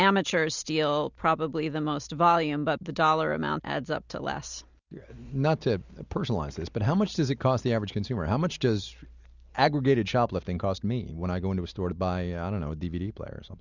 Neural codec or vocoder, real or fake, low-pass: none; real; 7.2 kHz